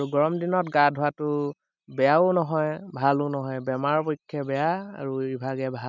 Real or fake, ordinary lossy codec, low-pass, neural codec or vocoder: real; none; 7.2 kHz; none